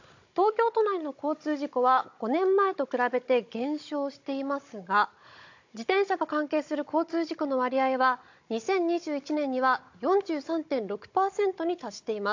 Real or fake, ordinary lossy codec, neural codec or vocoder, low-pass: fake; AAC, 48 kbps; codec, 16 kHz, 16 kbps, FunCodec, trained on Chinese and English, 50 frames a second; 7.2 kHz